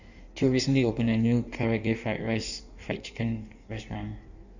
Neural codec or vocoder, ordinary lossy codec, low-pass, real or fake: codec, 16 kHz in and 24 kHz out, 1.1 kbps, FireRedTTS-2 codec; none; 7.2 kHz; fake